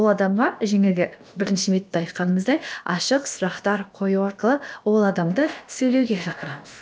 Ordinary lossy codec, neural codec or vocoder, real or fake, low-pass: none; codec, 16 kHz, about 1 kbps, DyCAST, with the encoder's durations; fake; none